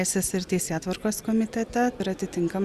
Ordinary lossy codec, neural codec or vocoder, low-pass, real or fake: Opus, 64 kbps; none; 14.4 kHz; real